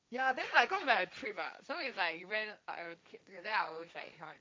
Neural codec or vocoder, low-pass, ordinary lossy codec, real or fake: codec, 16 kHz, 1.1 kbps, Voila-Tokenizer; none; none; fake